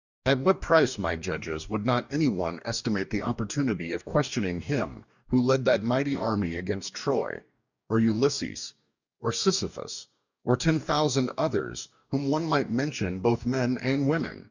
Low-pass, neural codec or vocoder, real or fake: 7.2 kHz; codec, 44.1 kHz, 2.6 kbps, DAC; fake